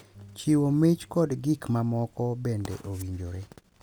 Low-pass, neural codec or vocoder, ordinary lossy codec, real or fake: none; none; none; real